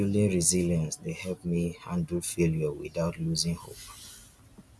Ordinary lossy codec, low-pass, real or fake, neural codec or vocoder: none; none; real; none